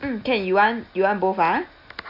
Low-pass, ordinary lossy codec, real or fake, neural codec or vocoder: 5.4 kHz; none; real; none